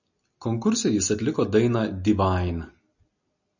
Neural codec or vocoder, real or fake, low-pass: none; real; 7.2 kHz